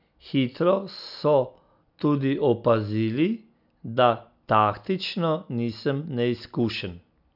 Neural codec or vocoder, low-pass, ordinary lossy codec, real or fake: none; 5.4 kHz; none; real